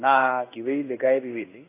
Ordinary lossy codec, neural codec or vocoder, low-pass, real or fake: AAC, 16 kbps; codec, 16 kHz in and 24 kHz out, 1 kbps, XY-Tokenizer; 3.6 kHz; fake